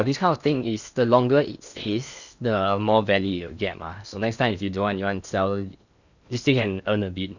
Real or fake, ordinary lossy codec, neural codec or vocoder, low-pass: fake; none; codec, 16 kHz in and 24 kHz out, 0.8 kbps, FocalCodec, streaming, 65536 codes; 7.2 kHz